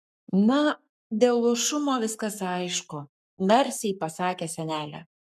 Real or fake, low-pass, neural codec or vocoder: fake; 14.4 kHz; codec, 44.1 kHz, 7.8 kbps, Pupu-Codec